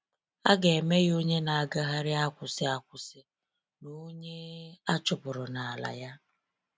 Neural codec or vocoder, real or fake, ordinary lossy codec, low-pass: none; real; none; none